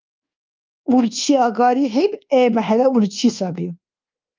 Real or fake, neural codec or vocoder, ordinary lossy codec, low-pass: fake; codec, 24 kHz, 1.2 kbps, DualCodec; Opus, 24 kbps; 7.2 kHz